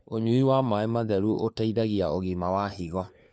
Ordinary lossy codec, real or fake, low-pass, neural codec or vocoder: none; fake; none; codec, 16 kHz, 4 kbps, FunCodec, trained on LibriTTS, 50 frames a second